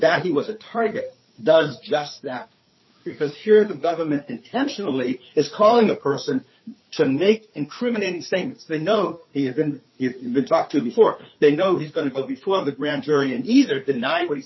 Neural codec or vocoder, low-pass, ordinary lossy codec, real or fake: codec, 16 kHz, 4 kbps, FreqCodec, larger model; 7.2 kHz; MP3, 24 kbps; fake